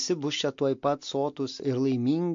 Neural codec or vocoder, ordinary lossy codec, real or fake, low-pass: none; MP3, 48 kbps; real; 7.2 kHz